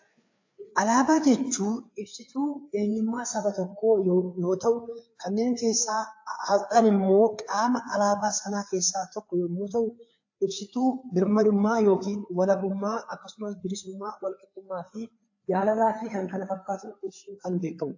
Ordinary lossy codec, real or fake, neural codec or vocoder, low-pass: AAC, 48 kbps; fake; codec, 16 kHz, 4 kbps, FreqCodec, larger model; 7.2 kHz